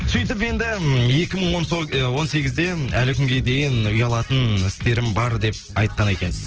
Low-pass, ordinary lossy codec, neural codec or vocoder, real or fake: 7.2 kHz; Opus, 16 kbps; none; real